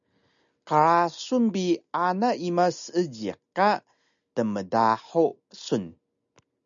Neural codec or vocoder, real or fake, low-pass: none; real; 7.2 kHz